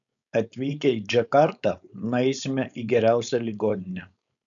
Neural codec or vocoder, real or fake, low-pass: codec, 16 kHz, 4.8 kbps, FACodec; fake; 7.2 kHz